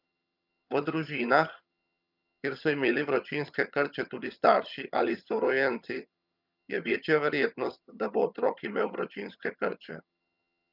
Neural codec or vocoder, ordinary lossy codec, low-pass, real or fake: vocoder, 22.05 kHz, 80 mel bands, HiFi-GAN; none; 5.4 kHz; fake